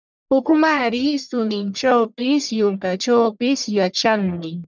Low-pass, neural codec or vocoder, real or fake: 7.2 kHz; codec, 44.1 kHz, 1.7 kbps, Pupu-Codec; fake